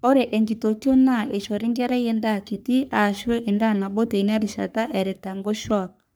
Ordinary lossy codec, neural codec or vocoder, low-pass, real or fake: none; codec, 44.1 kHz, 3.4 kbps, Pupu-Codec; none; fake